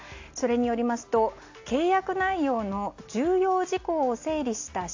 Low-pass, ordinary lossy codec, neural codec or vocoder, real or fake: 7.2 kHz; AAC, 48 kbps; none; real